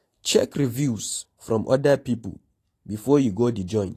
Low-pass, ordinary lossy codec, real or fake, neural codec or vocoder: 14.4 kHz; AAC, 48 kbps; fake; vocoder, 44.1 kHz, 128 mel bands every 512 samples, BigVGAN v2